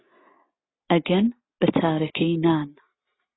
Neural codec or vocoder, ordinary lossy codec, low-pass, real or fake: none; AAC, 16 kbps; 7.2 kHz; real